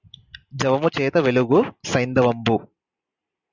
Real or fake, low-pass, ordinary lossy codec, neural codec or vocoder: real; 7.2 kHz; Opus, 64 kbps; none